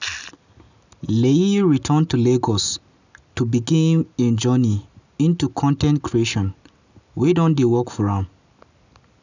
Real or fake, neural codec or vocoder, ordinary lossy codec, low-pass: real; none; none; 7.2 kHz